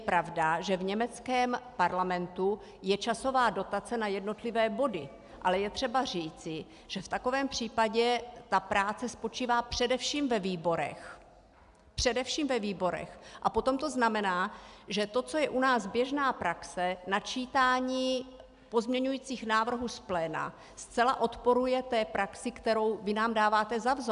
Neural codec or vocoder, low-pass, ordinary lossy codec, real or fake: none; 10.8 kHz; AAC, 96 kbps; real